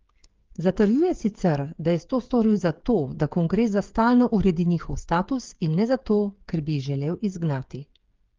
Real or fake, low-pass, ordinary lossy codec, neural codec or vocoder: fake; 7.2 kHz; Opus, 32 kbps; codec, 16 kHz, 8 kbps, FreqCodec, smaller model